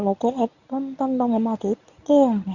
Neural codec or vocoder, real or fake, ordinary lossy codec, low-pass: codec, 24 kHz, 0.9 kbps, WavTokenizer, medium speech release version 1; fake; none; 7.2 kHz